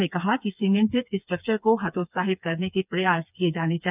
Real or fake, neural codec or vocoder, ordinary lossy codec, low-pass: fake; codec, 16 kHz, 4 kbps, FreqCodec, smaller model; none; 3.6 kHz